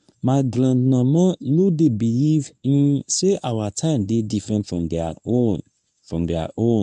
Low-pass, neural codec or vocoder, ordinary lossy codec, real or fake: 10.8 kHz; codec, 24 kHz, 0.9 kbps, WavTokenizer, medium speech release version 1; AAC, 96 kbps; fake